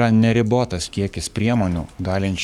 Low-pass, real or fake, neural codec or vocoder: 19.8 kHz; fake; codec, 44.1 kHz, 7.8 kbps, Pupu-Codec